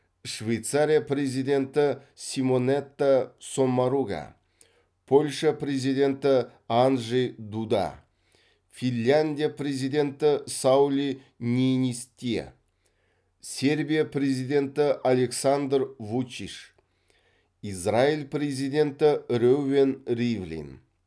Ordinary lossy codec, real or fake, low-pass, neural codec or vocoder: none; real; none; none